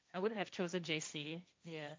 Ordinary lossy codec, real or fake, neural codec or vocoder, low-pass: none; fake; codec, 16 kHz, 1.1 kbps, Voila-Tokenizer; none